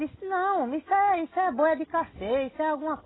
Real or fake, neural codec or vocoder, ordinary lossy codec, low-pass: real; none; AAC, 16 kbps; 7.2 kHz